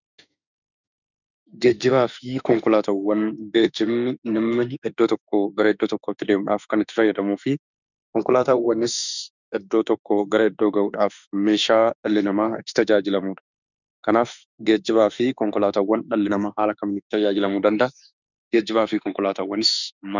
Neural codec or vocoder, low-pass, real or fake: autoencoder, 48 kHz, 32 numbers a frame, DAC-VAE, trained on Japanese speech; 7.2 kHz; fake